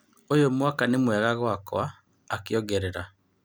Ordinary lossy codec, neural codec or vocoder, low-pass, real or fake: none; none; none; real